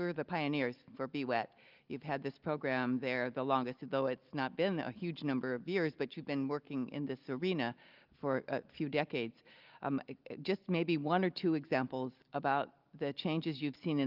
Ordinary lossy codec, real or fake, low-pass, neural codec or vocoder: Opus, 24 kbps; real; 5.4 kHz; none